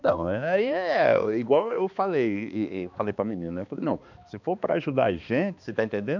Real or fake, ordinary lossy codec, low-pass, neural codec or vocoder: fake; none; 7.2 kHz; codec, 16 kHz, 2 kbps, X-Codec, HuBERT features, trained on balanced general audio